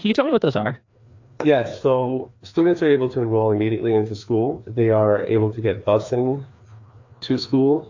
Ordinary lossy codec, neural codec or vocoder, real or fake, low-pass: AAC, 48 kbps; codec, 16 kHz, 2 kbps, FreqCodec, larger model; fake; 7.2 kHz